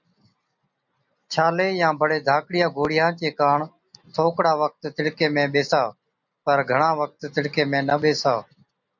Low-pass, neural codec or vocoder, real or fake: 7.2 kHz; none; real